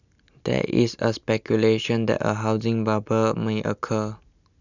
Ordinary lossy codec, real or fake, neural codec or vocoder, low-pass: none; real; none; 7.2 kHz